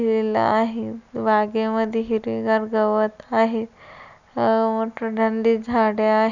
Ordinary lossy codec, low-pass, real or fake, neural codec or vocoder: none; 7.2 kHz; real; none